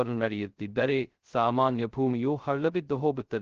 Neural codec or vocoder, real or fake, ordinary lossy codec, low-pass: codec, 16 kHz, 0.2 kbps, FocalCodec; fake; Opus, 16 kbps; 7.2 kHz